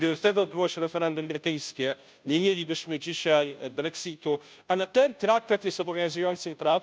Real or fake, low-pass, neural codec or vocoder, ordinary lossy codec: fake; none; codec, 16 kHz, 0.5 kbps, FunCodec, trained on Chinese and English, 25 frames a second; none